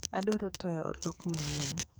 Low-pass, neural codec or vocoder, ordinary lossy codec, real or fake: none; codec, 44.1 kHz, 2.6 kbps, SNAC; none; fake